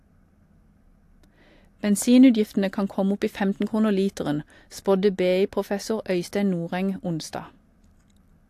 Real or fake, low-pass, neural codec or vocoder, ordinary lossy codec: real; 14.4 kHz; none; AAC, 64 kbps